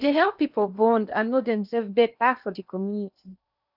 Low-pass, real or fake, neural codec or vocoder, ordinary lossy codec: 5.4 kHz; fake; codec, 16 kHz in and 24 kHz out, 0.8 kbps, FocalCodec, streaming, 65536 codes; none